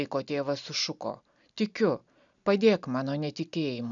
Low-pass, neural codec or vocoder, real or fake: 7.2 kHz; none; real